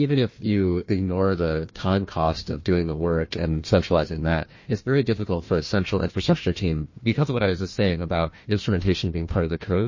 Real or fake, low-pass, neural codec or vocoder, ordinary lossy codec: fake; 7.2 kHz; codec, 16 kHz, 1 kbps, FunCodec, trained on Chinese and English, 50 frames a second; MP3, 32 kbps